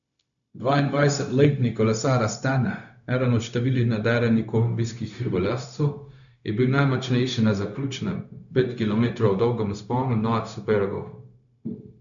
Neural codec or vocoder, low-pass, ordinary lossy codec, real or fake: codec, 16 kHz, 0.4 kbps, LongCat-Audio-Codec; 7.2 kHz; none; fake